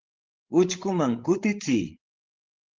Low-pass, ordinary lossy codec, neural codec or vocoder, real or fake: 7.2 kHz; Opus, 16 kbps; codec, 16 kHz, 16 kbps, FreqCodec, larger model; fake